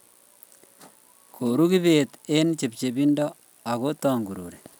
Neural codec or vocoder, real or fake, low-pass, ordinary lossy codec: none; real; none; none